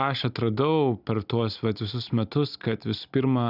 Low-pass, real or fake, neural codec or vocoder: 5.4 kHz; real; none